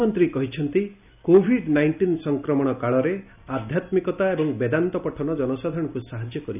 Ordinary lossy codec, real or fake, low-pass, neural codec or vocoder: none; real; 3.6 kHz; none